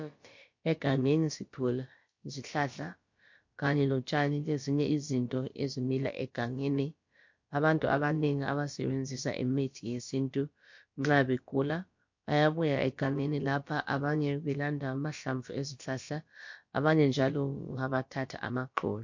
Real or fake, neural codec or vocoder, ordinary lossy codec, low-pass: fake; codec, 16 kHz, about 1 kbps, DyCAST, with the encoder's durations; MP3, 48 kbps; 7.2 kHz